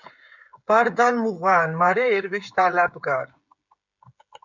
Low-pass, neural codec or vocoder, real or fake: 7.2 kHz; codec, 16 kHz, 16 kbps, FreqCodec, smaller model; fake